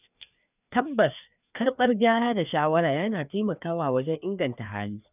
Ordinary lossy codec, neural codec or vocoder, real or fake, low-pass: none; codec, 16 kHz, 2 kbps, FreqCodec, larger model; fake; 3.6 kHz